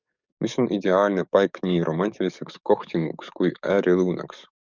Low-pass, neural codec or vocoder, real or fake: 7.2 kHz; codec, 44.1 kHz, 7.8 kbps, DAC; fake